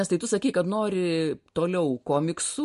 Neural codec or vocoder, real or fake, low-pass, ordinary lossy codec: none; real; 14.4 kHz; MP3, 48 kbps